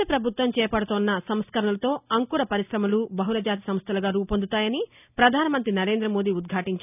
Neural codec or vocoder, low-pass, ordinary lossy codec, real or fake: none; 3.6 kHz; none; real